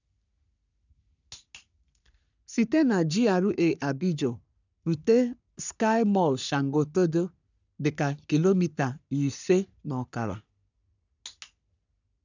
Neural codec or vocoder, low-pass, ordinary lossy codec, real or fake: codec, 44.1 kHz, 3.4 kbps, Pupu-Codec; 7.2 kHz; none; fake